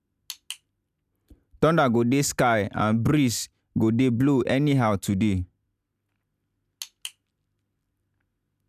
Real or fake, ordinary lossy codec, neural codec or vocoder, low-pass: real; none; none; 14.4 kHz